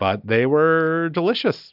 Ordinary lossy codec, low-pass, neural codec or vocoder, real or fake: AAC, 48 kbps; 5.4 kHz; none; real